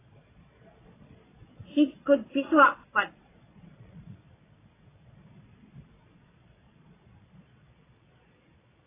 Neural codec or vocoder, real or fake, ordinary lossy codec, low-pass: vocoder, 22.05 kHz, 80 mel bands, Vocos; fake; AAC, 16 kbps; 3.6 kHz